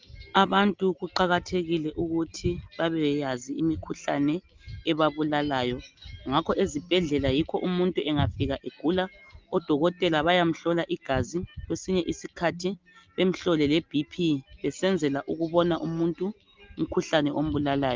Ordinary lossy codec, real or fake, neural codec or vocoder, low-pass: Opus, 24 kbps; real; none; 7.2 kHz